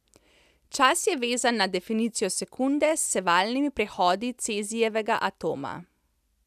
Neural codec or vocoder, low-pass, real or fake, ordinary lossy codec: none; 14.4 kHz; real; none